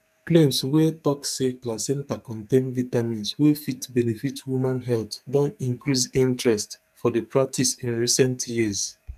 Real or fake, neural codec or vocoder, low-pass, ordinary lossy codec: fake; codec, 44.1 kHz, 2.6 kbps, SNAC; 14.4 kHz; none